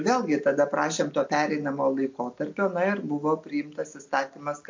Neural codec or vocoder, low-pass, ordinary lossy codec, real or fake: none; 7.2 kHz; MP3, 48 kbps; real